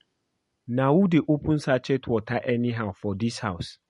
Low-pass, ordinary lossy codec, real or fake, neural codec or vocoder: 14.4 kHz; MP3, 48 kbps; real; none